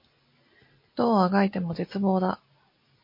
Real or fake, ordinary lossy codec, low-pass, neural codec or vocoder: real; MP3, 32 kbps; 5.4 kHz; none